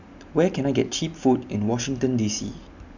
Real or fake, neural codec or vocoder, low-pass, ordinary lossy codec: real; none; 7.2 kHz; none